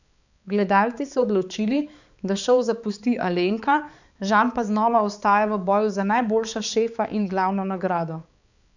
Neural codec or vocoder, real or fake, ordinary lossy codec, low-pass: codec, 16 kHz, 4 kbps, X-Codec, HuBERT features, trained on balanced general audio; fake; none; 7.2 kHz